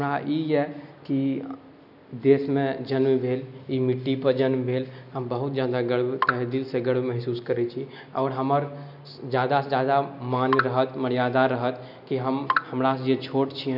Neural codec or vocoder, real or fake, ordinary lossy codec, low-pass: none; real; none; 5.4 kHz